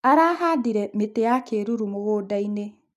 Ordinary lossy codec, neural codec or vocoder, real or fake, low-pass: none; none; real; 14.4 kHz